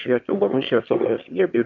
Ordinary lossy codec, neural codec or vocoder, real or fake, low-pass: MP3, 64 kbps; autoencoder, 22.05 kHz, a latent of 192 numbers a frame, VITS, trained on one speaker; fake; 7.2 kHz